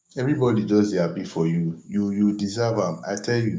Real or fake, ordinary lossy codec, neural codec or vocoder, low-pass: fake; none; codec, 16 kHz, 6 kbps, DAC; none